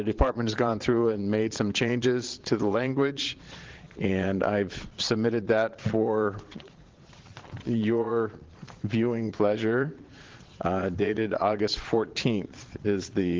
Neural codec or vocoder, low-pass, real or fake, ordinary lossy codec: vocoder, 22.05 kHz, 80 mel bands, Vocos; 7.2 kHz; fake; Opus, 16 kbps